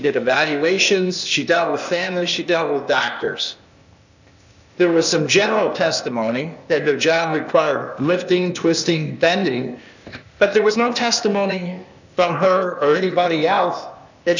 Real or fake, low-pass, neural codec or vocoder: fake; 7.2 kHz; codec, 16 kHz, 0.8 kbps, ZipCodec